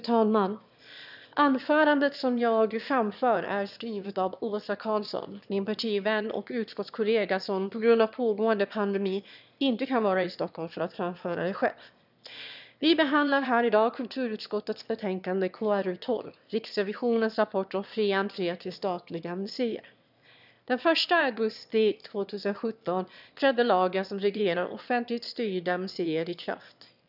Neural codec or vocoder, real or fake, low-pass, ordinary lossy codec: autoencoder, 22.05 kHz, a latent of 192 numbers a frame, VITS, trained on one speaker; fake; 5.4 kHz; none